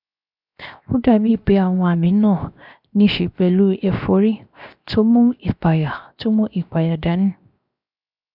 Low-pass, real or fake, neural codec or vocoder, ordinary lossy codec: 5.4 kHz; fake; codec, 16 kHz, 0.7 kbps, FocalCodec; none